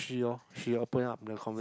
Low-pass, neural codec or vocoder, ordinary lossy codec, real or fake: none; none; none; real